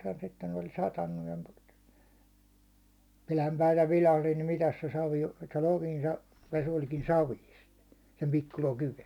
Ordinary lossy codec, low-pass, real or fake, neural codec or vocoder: none; 19.8 kHz; real; none